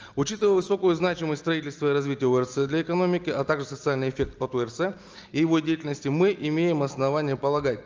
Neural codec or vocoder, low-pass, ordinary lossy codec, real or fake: none; 7.2 kHz; Opus, 24 kbps; real